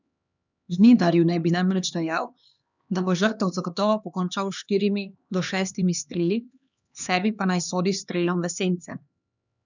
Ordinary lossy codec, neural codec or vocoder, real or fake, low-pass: none; codec, 16 kHz, 2 kbps, X-Codec, HuBERT features, trained on LibriSpeech; fake; 7.2 kHz